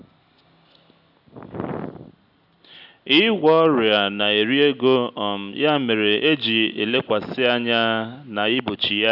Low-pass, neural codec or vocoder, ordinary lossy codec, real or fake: 5.4 kHz; none; none; real